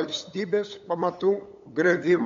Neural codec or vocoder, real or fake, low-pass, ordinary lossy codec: codec, 16 kHz, 8 kbps, FunCodec, trained on LibriTTS, 25 frames a second; fake; 7.2 kHz; MP3, 48 kbps